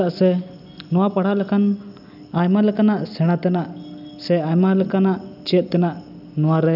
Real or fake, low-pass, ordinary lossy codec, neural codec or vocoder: real; 5.4 kHz; none; none